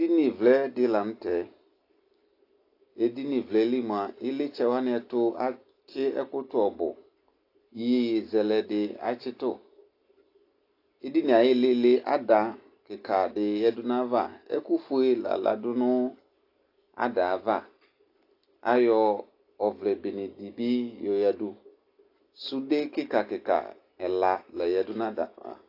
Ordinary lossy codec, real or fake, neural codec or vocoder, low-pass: AAC, 32 kbps; real; none; 5.4 kHz